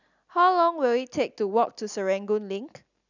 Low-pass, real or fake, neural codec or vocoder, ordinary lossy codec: 7.2 kHz; real; none; none